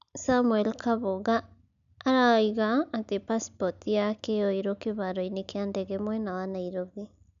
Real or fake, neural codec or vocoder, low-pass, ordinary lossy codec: real; none; 7.2 kHz; none